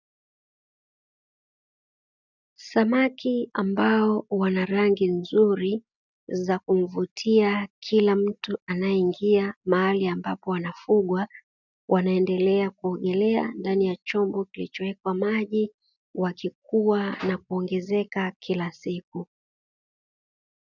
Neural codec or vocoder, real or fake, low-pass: none; real; 7.2 kHz